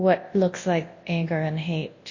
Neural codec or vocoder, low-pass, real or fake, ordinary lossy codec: codec, 24 kHz, 0.9 kbps, WavTokenizer, large speech release; 7.2 kHz; fake; MP3, 32 kbps